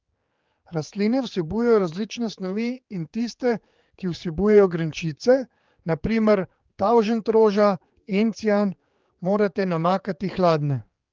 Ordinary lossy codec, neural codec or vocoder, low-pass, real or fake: Opus, 32 kbps; codec, 16 kHz, 4 kbps, X-Codec, HuBERT features, trained on general audio; 7.2 kHz; fake